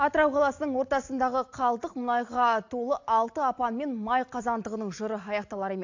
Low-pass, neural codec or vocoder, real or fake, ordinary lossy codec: 7.2 kHz; none; real; AAC, 48 kbps